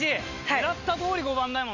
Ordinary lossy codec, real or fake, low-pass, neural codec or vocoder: none; real; 7.2 kHz; none